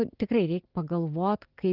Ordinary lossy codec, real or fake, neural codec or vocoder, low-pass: Opus, 16 kbps; real; none; 5.4 kHz